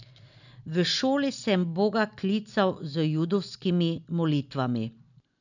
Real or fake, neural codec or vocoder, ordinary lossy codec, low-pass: real; none; none; 7.2 kHz